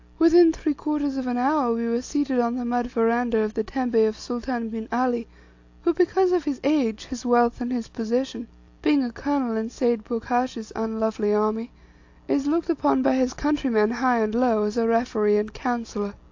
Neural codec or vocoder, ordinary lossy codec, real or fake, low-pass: none; AAC, 48 kbps; real; 7.2 kHz